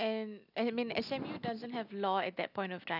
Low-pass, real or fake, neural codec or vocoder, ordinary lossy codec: 5.4 kHz; real; none; none